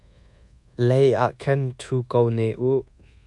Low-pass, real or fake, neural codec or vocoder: 10.8 kHz; fake; codec, 24 kHz, 1.2 kbps, DualCodec